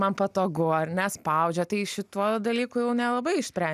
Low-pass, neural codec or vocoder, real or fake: 14.4 kHz; none; real